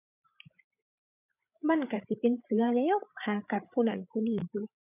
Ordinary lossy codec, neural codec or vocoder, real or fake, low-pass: none; codec, 16 kHz, 8 kbps, FreqCodec, larger model; fake; 3.6 kHz